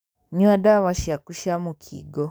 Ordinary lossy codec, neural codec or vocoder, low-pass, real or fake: none; codec, 44.1 kHz, 7.8 kbps, DAC; none; fake